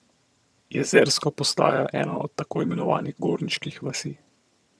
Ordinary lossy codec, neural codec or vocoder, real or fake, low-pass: none; vocoder, 22.05 kHz, 80 mel bands, HiFi-GAN; fake; none